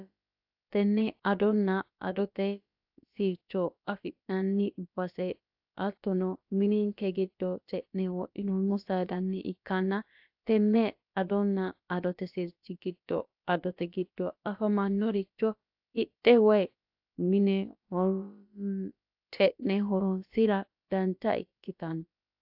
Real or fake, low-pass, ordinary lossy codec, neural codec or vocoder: fake; 5.4 kHz; AAC, 48 kbps; codec, 16 kHz, about 1 kbps, DyCAST, with the encoder's durations